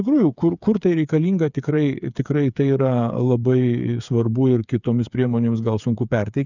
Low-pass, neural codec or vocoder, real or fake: 7.2 kHz; codec, 16 kHz, 8 kbps, FreqCodec, smaller model; fake